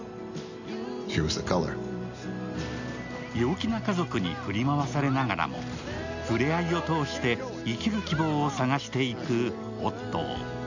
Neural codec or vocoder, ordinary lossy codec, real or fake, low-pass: none; none; real; 7.2 kHz